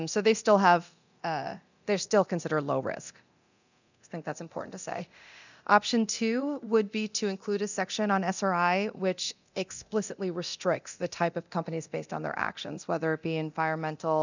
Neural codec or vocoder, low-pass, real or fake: codec, 24 kHz, 0.9 kbps, DualCodec; 7.2 kHz; fake